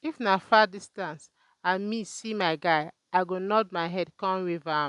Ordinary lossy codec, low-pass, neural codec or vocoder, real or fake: none; 10.8 kHz; none; real